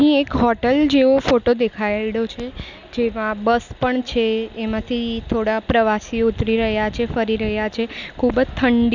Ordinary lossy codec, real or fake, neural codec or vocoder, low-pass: none; real; none; 7.2 kHz